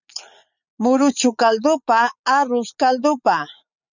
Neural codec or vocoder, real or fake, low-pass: vocoder, 44.1 kHz, 80 mel bands, Vocos; fake; 7.2 kHz